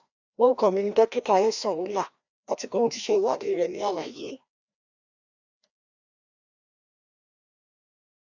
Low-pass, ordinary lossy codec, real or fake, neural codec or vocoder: 7.2 kHz; none; fake; codec, 16 kHz, 1 kbps, FreqCodec, larger model